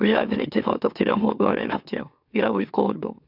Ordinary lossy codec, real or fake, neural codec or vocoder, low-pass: none; fake; autoencoder, 44.1 kHz, a latent of 192 numbers a frame, MeloTTS; 5.4 kHz